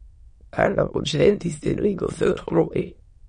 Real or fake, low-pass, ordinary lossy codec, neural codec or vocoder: fake; 9.9 kHz; MP3, 48 kbps; autoencoder, 22.05 kHz, a latent of 192 numbers a frame, VITS, trained on many speakers